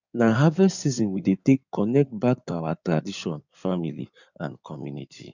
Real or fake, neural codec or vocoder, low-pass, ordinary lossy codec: fake; codec, 16 kHz in and 24 kHz out, 2.2 kbps, FireRedTTS-2 codec; 7.2 kHz; none